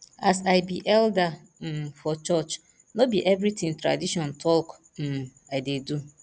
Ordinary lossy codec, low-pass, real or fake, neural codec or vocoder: none; none; real; none